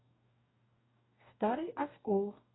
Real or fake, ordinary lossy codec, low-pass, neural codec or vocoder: fake; AAC, 16 kbps; 7.2 kHz; codec, 44.1 kHz, 2.6 kbps, DAC